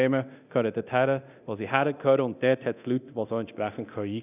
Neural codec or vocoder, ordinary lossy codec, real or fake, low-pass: codec, 24 kHz, 0.9 kbps, DualCodec; none; fake; 3.6 kHz